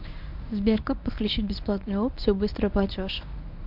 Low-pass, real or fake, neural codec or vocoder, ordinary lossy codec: 5.4 kHz; fake; codec, 24 kHz, 0.9 kbps, WavTokenizer, medium speech release version 1; MP3, 48 kbps